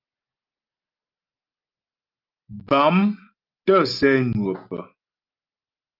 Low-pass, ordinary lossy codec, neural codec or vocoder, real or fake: 5.4 kHz; Opus, 24 kbps; none; real